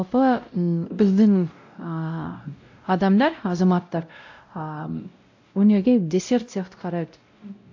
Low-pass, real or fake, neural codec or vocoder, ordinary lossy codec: 7.2 kHz; fake; codec, 16 kHz, 0.5 kbps, X-Codec, WavLM features, trained on Multilingual LibriSpeech; AAC, 48 kbps